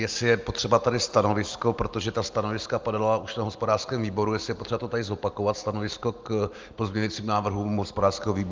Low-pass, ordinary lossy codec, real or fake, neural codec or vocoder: 7.2 kHz; Opus, 32 kbps; real; none